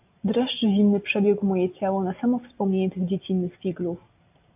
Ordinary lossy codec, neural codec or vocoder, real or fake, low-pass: AAC, 32 kbps; none; real; 3.6 kHz